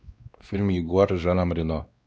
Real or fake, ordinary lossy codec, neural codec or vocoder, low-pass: fake; none; codec, 16 kHz, 2 kbps, X-Codec, WavLM features, trained on Multilingual LibriSpeech; none